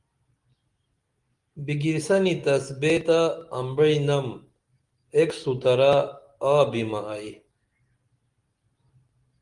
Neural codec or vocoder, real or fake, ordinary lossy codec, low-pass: none; real; Opus, 24 kbps; 10.8 kHz